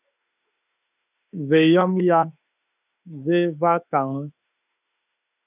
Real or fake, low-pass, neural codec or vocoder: fake; 3.6 kHz; autoencoder, 48 kHz, 32 numbers a frame, DAC-VAE, trained on Japanese speech